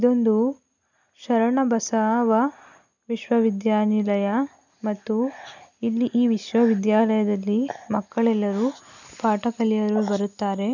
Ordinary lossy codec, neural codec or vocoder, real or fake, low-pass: none; none; real; 7.2 kHz